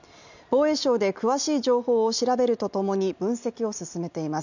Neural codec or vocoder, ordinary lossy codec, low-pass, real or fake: none; none; 7.2 kHz; real